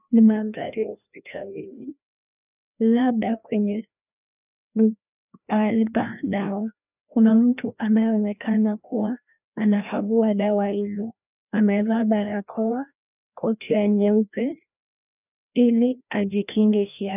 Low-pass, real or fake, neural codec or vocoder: 3.6 kHz; fake; codec, 16 kHz, 1 kbps, FreqCodec, larger model